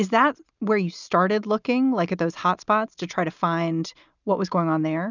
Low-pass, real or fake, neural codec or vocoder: 7.2 kHz; real; none